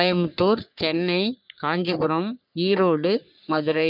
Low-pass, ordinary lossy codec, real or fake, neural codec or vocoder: 5.4 kHz; none; fake; codec, 44.1 kHz, 3.4 kbps, Pupu-Codec